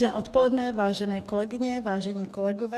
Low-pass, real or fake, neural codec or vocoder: 14.4 kHz; fake; codec, 44.1 kHz, 2.6 kbps, DAC